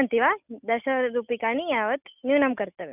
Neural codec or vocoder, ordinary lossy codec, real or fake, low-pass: none; none; real; 3.6 kHz